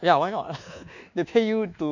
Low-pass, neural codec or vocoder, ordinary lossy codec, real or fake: 7.2 kHz; codec, 24 kHz, 1.2 kbps, DualCodec; none; fake